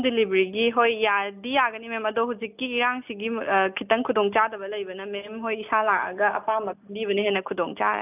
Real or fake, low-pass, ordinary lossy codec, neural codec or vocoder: real; 3.6 kHz; none; none